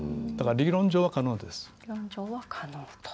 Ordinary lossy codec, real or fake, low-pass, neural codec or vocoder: none; real; none; none